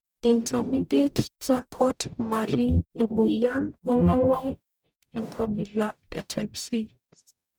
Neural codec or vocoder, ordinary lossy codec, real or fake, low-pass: codec, 44.1 kHz, 0.9 kbps, DAC; none; fake; none